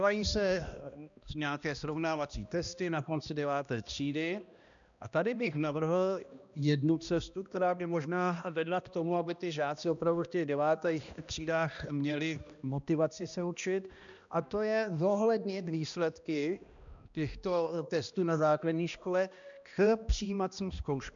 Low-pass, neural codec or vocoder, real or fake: 7.2 kHz; codec, 16 kHz, 1 kbps, X-Codec, HuBERT features, trained on balanced general audio; fake